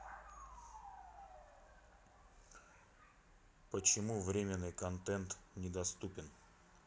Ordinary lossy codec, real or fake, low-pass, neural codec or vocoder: none; real; none; none